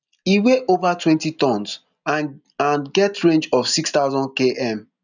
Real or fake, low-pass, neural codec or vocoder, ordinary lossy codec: real; 7.2 kHz; none; none